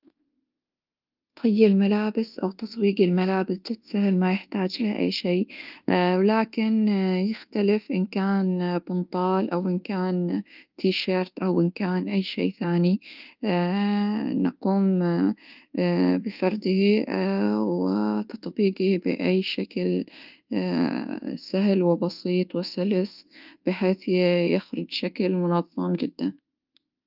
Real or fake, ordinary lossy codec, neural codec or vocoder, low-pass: fake; Opus, 24 kbps; codec, 24 kHz, 1.2 kbps, DualCodec; 5.4 kHz